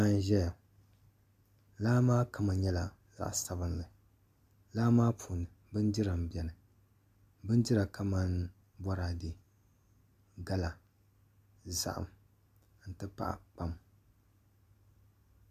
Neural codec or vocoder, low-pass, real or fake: none; 14.4 kHz; real